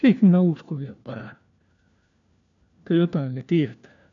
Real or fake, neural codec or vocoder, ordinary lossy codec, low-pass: fake; codec, 16 kHz, 1 kbps, FunCodec, trained on LibriTTS, 50 frames a second; none; 7.2 kHz